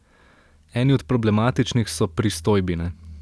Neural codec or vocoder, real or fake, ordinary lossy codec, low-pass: none; real; none; none